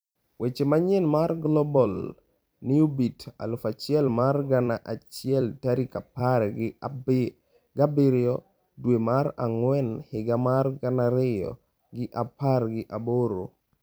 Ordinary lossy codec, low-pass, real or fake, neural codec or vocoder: none; none; fake; vocoder, 44.1 kHz, 128 mel bands every 256 samples, BigVGAN v2